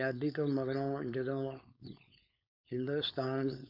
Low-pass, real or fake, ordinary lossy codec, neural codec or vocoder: 5.4 kHz; fake; none; codec, 16 kHz, 4.8 kbps, FACodec